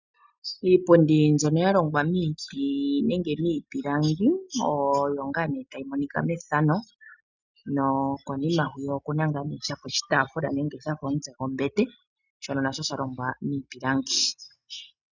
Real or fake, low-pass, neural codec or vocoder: real; 7.2 kHz; none